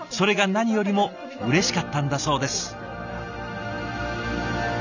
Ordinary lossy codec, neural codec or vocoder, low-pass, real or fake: none; none; 7.2 kHz; real